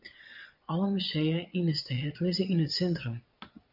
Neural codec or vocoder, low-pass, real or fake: vocoder, 22.05 kHz, 80 mel bands, Vocos; 5.4 kHz; fake